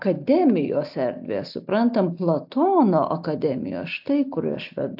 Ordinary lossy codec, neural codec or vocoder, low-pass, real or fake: AAC, 48 kbps; none; 5.4 kHz; real